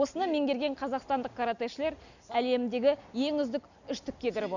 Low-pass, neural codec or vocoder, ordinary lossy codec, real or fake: 7.2 kHz; none; none; real